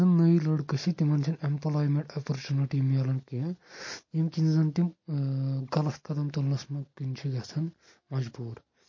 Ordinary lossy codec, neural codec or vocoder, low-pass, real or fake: MP3, 32 kbps; none; 7.2 kHz; real